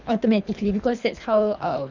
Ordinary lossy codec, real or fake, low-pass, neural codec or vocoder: none; fake; 7.2 kHz; codec, 24 kHz, 3 kbps, HILCodec